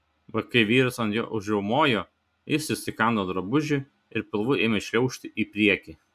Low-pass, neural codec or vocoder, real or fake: 14.4 kHz; none; real